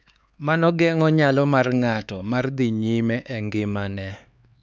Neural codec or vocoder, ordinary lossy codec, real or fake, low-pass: codec, 16 kHz, 4 kbps, X-Codec, HuBERT features, trained on LibriSpeech; none; fake; none